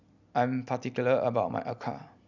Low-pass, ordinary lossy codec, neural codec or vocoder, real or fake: 7.2 kHz; none; none; real